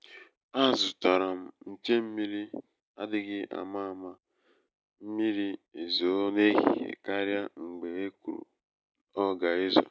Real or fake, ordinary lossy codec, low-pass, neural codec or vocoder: real; none; none; none